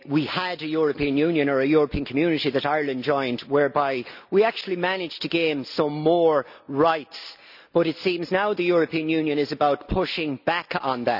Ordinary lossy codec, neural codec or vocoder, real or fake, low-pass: none; none; real; 5.4 kHz